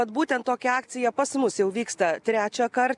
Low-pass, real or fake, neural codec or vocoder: 10.8 kHz; real; none